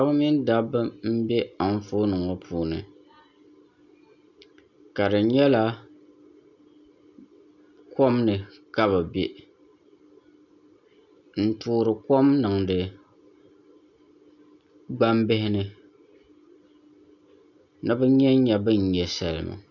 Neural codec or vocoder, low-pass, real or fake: none; 7.2 kHz; real